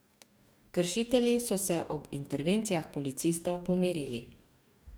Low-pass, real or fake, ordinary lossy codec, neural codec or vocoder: none; fake; none; codec, 44.1 kHz, 2.6 kbps, DAC